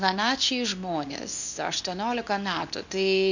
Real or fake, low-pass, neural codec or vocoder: fake; 7.2 kHz; codec, 24 kHz, 0.9 kbps, WavTokenizer, medium speech release version 2